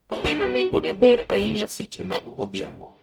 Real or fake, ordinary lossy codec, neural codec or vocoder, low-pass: fake; none; codec, 44.1 kHz, 0.9 kbps, DAC; none